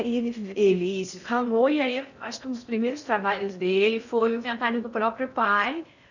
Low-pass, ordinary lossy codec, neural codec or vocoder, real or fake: 7.2 kHz; none; codec, 16 kHz in and 24 kHz out, 0.6 kbps, FocalCodec, streaming, 4096 codes; fake